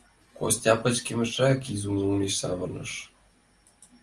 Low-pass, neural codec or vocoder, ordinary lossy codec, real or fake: 10.8 kHz; none; Opus, 24 kbps; real